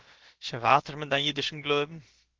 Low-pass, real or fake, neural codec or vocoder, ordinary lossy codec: 7.2 kHz; fake; codec, 16 kHz, about 1 kbps, DyCAST, with the encoder's durations; Opus, 16 kbps